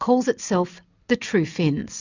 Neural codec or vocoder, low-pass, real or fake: none; 7.2 kHz; real